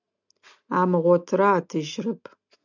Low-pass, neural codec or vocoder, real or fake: 7.2 kHz; none; real